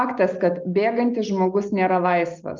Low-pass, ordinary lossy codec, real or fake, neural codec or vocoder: 7.2 kHz; Opus, 32 kbps; real; none